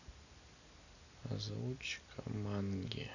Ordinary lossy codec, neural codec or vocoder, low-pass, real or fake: none; none; 7.2 kHz; real